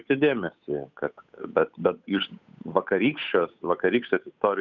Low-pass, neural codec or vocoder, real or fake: 7.2 kHz; codec, 16 kHz, 8 kbps, FunCodec, trained on Chinese and English, 25 frames a second; fake